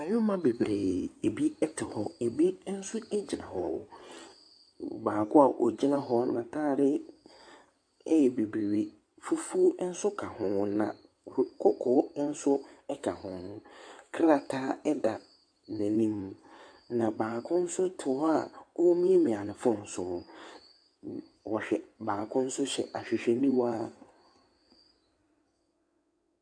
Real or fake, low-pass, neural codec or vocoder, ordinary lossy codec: fake; 9.9 kHz; codec, 16 kHz in and 24 kHz out, 2.2 kbps, FireRedTTS-2 codec; AAC, 64 kbps